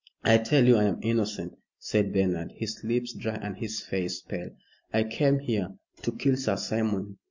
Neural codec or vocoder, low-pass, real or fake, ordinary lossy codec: none; 7.2 kHz; real; MP3, 64 kbps